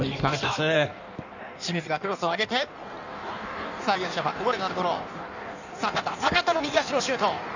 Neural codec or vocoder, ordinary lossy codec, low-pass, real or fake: codec, 16 kHz in and 24 kHz out, 1.1 kbps, FireRedTTS-2 codec; none; 7.2 kHz; fake